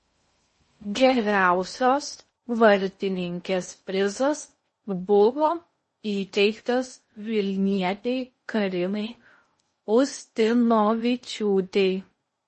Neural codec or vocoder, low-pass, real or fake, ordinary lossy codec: codec, 16 kHz in and 24 kHz out, 0.6 kbps, FocalCodec, streaming, 2048 codes; 10.8 kHz; fake; MP3, 32 kbps